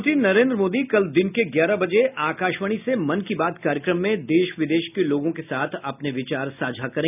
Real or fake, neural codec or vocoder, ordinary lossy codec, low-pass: real; none; none; 3.6 kHz